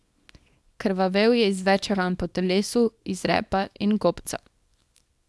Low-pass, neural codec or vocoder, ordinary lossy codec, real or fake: none; codec, 24 kHz, 0.9 kbps, WavTokenizer, small release; none; fake